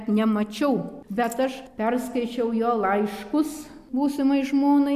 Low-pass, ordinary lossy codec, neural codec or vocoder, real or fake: 14.4 kHz; MP3, 96 kbps; none; real